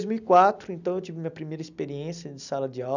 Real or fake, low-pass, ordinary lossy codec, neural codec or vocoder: real; 7.2 kHz; none; none